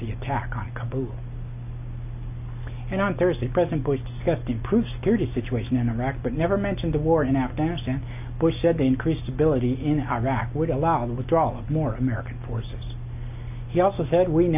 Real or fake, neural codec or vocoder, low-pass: real; none; 3.6 kHz